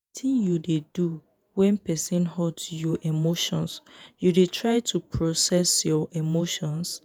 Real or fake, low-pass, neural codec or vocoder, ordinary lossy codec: fake; none; vocoder, 48 kHz, 128 mel bands, Vocos; none